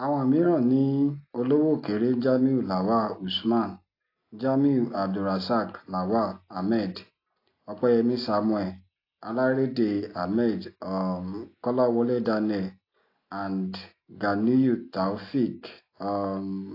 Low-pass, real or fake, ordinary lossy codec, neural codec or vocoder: 5.4 kHz; real; AAC, 32 kbps; none